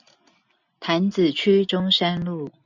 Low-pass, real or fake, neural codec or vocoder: 7.2 kHz; real; none